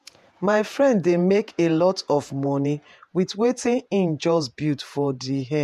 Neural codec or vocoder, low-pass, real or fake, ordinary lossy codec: vocoder, 48 kHz, 128 mel bands, Vocos; 14.4 kHz; fake; MP3, 96 kbps